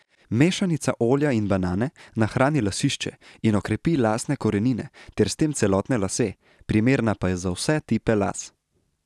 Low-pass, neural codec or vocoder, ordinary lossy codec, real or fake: none; none; none; real